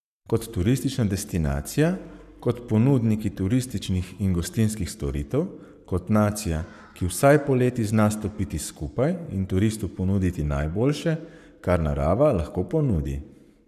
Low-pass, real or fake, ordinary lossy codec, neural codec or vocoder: 14.4 kHz; fake; none; codec, 44.1 kHz, 7.8 kbps, Pupu-Codec